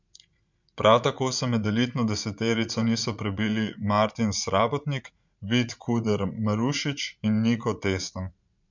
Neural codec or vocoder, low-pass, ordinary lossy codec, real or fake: vocoder, 44.1 kHz, 80 mel bands, Vocos; 7.2 kHz; MP3, 64 kbps; fake